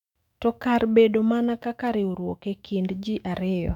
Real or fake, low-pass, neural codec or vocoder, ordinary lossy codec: fake; 19.8 kHz; autoencoder, 48 kHz, 128 numbers a frame, DAC-VAE, trained on Japanese speech; none